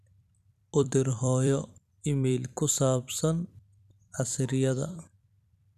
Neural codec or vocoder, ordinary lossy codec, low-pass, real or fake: vocoder, 44.1 kHz, 128 mel bands every 256 samples, BigVGAN v2; none; 14.4 kHz; fake